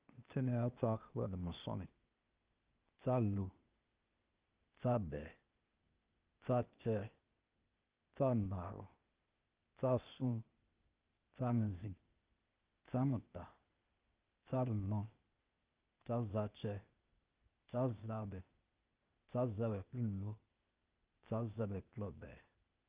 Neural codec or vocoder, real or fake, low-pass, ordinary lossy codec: codec, 16 kHz, 0.8 kbps, ZipCodec; fake; 3.6 kHz; Opus, 24 kbps